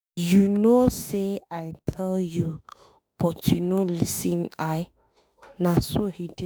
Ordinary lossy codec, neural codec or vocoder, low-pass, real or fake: none; autoencoder, 48 kHz, 32 numbers a frame, DAC-VAE, trained on Japanese speech; none; fake